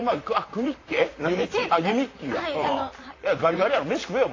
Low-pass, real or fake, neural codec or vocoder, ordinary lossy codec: 7.2 kHz; fake; vocoder, 44.1 kHz, 128 mel bands, Pupu-Vocoder; AAC, 32 kbps